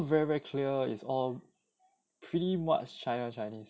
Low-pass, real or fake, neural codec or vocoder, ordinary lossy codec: none; real; none; none